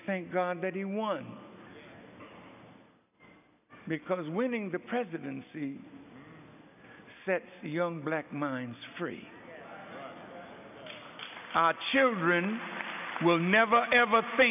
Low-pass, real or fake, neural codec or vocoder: 3.6 kHz; real; none